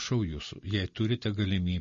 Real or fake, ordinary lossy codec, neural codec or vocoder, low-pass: real; MP3, 32 kbps; none; 7.2 kHz